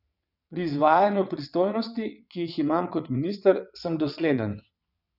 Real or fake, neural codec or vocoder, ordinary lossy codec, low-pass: fake; vocoder, 22.05 kHz, 80 mel bands, WaveNeXt; none; 5.4 kHz